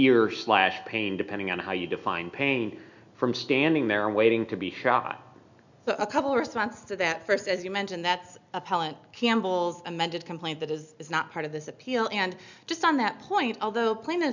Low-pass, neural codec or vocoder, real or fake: 7.2 kHz; none; real